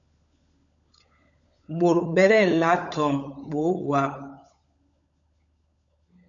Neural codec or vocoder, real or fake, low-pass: codec, 16 kHz, 16 kbps, FunCodec, trained on LibriTTS, 50 frames a second; fake; 7.2 kHz